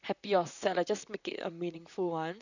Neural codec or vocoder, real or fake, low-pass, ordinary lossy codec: vocoder, 44.1 kHz, 128 mel bands, Pupu-Vocoder; fake; 7.2 kHz; none